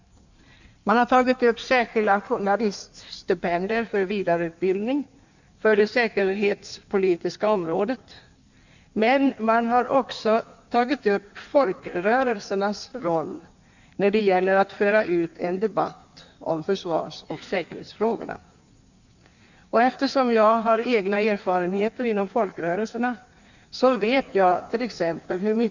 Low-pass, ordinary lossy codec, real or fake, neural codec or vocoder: 7.2 kHz; none; fake; codec, 16 kHz in and 24 kHz out, 1.1 kbps, FireRedTTS-2 codec